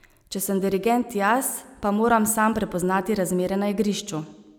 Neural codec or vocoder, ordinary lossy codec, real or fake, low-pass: none; none; real; none